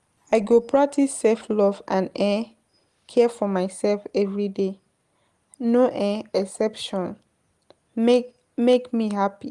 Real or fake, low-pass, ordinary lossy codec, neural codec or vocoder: real; 10.8 kHz; Opus, 24 kbps; none